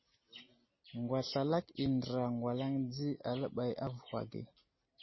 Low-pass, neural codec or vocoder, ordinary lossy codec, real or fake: 7.2 kHz; none; MP3, 24 kbps; real